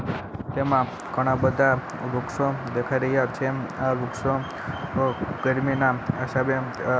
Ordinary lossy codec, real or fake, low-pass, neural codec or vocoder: none; real; none; none